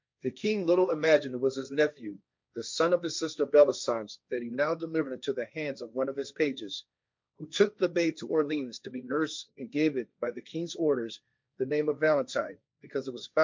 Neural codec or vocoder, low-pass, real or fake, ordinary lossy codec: codec, 16 kHz, 1.1 kbps, Voila-Tokenizer; 7.2 kHz; fake; MP3, 64 kbps